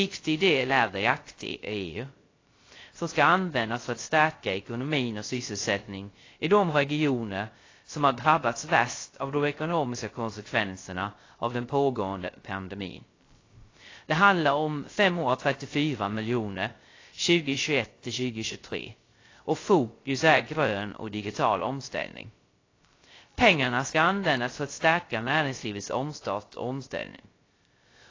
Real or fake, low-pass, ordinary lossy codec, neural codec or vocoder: fake; 7.2 kHz; AAC, 32 kbps; codec, 16 kHz, 0.3 kbps, FocalCodec